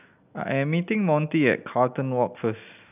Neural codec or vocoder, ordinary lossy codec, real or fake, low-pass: none; none; real; 3.6 kHz